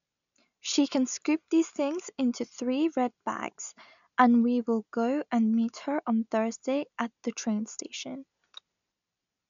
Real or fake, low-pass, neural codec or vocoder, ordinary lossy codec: real; 7.2 kHz; none; none